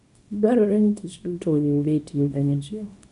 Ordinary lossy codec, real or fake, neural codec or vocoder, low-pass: none; fake; codec, 24 kHz, 0.9 kbps, WavTokenizer, small release; 10.8 kHz